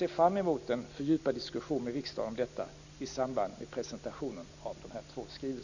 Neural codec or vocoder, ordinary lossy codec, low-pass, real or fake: none; none; 7.2 kHz; real